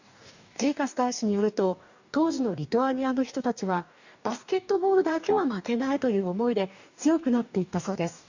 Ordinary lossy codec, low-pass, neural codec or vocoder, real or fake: none; 7.2 kHz; codec, 44.1 kHz, 2.6 kbps, DAC; fake